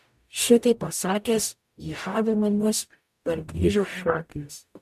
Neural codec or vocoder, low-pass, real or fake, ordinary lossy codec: codec, 44.1 kHz, 0.9 kbps, DAC; 14.4 kHz; fake; MP3, 96 kbps